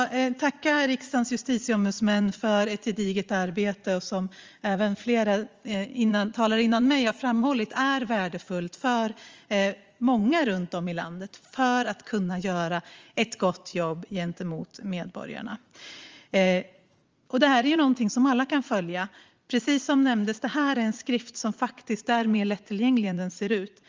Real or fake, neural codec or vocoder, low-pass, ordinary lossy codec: fake; vocoder, 44.1 kHz, 80 mel bands, Vocos; 7.2 kHz; Opus, 32 kbps